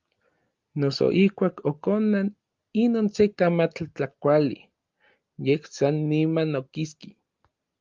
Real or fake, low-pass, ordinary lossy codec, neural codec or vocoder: real; 7.2 kHz; Opus, 32 kbps; none